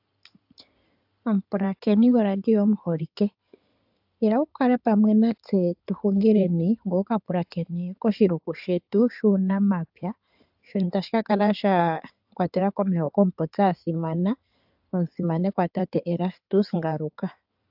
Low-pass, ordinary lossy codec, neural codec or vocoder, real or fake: 5.4 kHz; AAC, 48 kbps; codec, 16 kHz in and 24 kHz out, 2.2 kbps, FireRedTTS-2 codec; fake